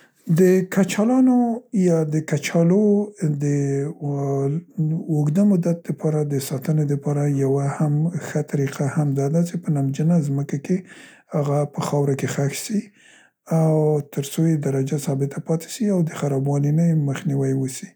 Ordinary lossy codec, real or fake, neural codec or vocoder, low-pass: none; real; none; none